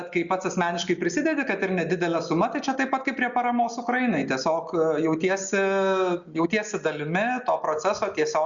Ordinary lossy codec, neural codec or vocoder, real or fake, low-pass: Opus, 64 kbps; none; real; 7.2 kHz